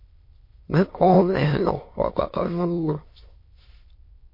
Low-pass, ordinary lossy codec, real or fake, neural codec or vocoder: 5.4 kHz; MP3, 32 kbps; fake; autoencoder, 22.05 kHz, a latent of 192 numbers a frame, VITS, trained on many speakers